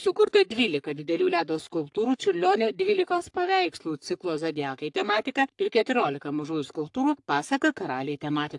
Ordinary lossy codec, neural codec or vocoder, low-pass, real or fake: AAC, 64 kbps; codec, 44.1 kHz, 3.4 kbps, Pupu-Codec; 10.8 kHz; fake